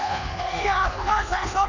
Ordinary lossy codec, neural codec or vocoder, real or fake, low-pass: none; codec, 24 kHz, 1.2 kbps, DualCodec; fake; 7.2 kHz